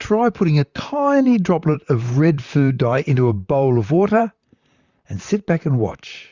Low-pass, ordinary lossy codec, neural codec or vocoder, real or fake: 7.2 kHz; Opus, 64 kbps; vocoder, 44.1 kHz, 128 mel bands, Pupu-Vocoder; fake